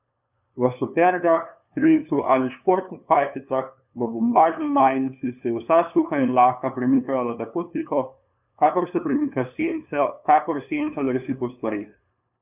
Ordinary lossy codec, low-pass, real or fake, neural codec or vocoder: none; 3.6 kHz; fake; codec, 16 kHz, 2 kbps, FunCodec, trained on LibriTTS, 25 frames a second